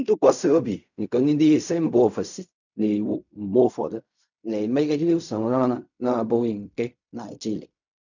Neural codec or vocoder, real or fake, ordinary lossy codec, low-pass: codec, 16 kHz in and 24 kHz out, 0.4 kbps, LongCat-Audio-Codec, fine tuned four codebook decoder; fake; none; 7.2 kHz